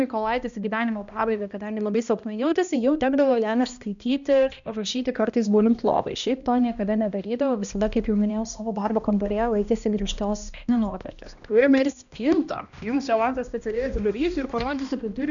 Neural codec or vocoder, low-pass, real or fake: codec, 16 kHz, 1 kbps, X-Codec, HuBERT features, trained on balanced general audio; 7.2 kHz; fake